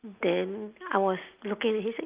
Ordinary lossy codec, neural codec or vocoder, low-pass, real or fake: Opus, 24 kbps; none; 3.6 kHz; real